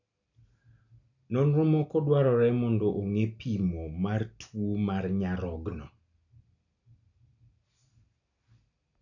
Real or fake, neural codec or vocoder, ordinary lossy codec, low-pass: real; none; none; 7.2 kHz